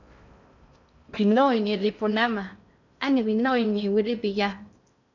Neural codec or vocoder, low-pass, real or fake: codec, 16 kHz in and 24 kHz out, 0.6 kbps, FocalCodec, streaming, 4096 codes; 7.2 kHz; fake